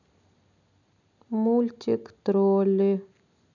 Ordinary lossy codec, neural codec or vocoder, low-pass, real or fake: none; none; 7.2 kHz; real